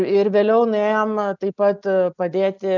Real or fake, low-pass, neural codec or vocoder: real; 7.2 kHz; none